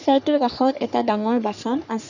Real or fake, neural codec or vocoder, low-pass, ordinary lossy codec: fake; codec, 44.1 kHz, 3.4 kbps, Pupu-Codec; 7.2 kHz; none